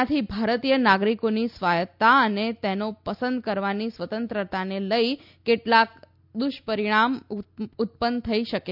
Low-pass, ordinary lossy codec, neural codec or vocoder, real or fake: 5.4 kHz; none; none; real